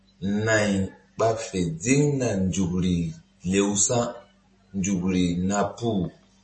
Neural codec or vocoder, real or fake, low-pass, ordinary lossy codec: none; real; 10.8 kHz; MP3, 32 kbps